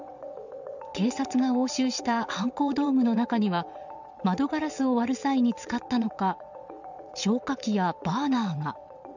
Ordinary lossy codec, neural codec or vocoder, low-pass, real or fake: none; vocoder, 22.05 kHz, 80 mel bands, WaveNeXt; 7.2 kHz; fake